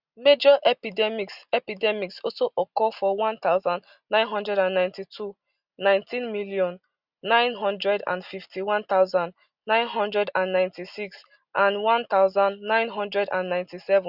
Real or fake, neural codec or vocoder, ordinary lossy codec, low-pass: real; none; Opus, 64 kbps; 5.4 kHz